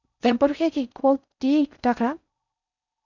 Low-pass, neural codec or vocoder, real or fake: 7.2 kHz; codec, 16 kHz in and 24 kHz out, 0.6 kbps, FocalCodec, streaming, 4096 codes; fake